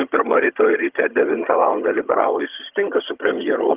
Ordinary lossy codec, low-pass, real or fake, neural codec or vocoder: Opus, 32 kbps; 3.6 kHz; fake; vocoder, 22.05 kHz, 80 mel bands, HiFi-GAN